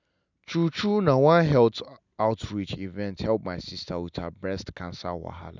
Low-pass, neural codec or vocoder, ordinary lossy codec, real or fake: 7.2 kHz; none; none; real